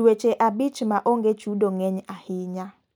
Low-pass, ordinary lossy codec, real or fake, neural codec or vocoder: 19.8 kHz; none; real; none